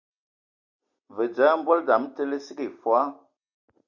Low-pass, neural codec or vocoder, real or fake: 7.2 kHz; none; real